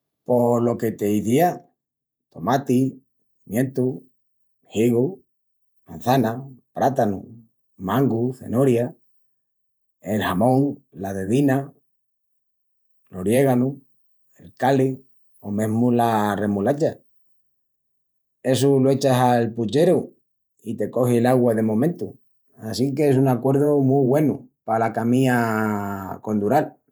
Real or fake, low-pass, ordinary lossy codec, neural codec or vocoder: fake; none; none; vocoder, 48 kHz, 128 mel bands, Vocos